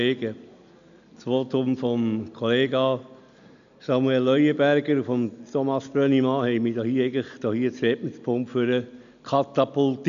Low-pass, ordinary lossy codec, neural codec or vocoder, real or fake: 7.2 kHz; none; none; real